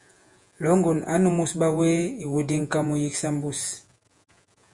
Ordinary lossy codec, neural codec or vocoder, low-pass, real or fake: Opus, 64 kbps; vocoder, 48 kHz, 128 mel bands, Vocos; 10.8 kHz; fake